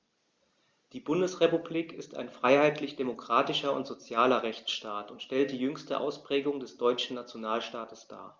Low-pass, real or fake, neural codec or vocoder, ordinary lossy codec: 7.2 kHz; real; none; Opus, 32 kbps